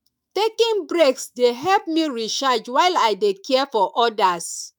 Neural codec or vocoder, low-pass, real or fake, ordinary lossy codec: autoencoder, 48 kHz, 128 numbers a frame, DAC-VAE, trained on Japanese speech; 19.8 kHz; fake; none